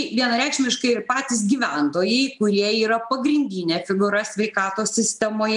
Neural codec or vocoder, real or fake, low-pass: none; real; 10.8 kHz